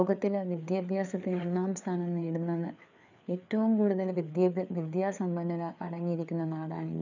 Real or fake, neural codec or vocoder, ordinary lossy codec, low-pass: fake; codec, 16 kHz, 4 kbps, FreqCodec, larger model; none; 7.2 kHz